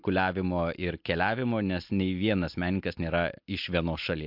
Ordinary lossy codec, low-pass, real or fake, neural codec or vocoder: MP3, 48 kbps; 5.4 kHz; real; none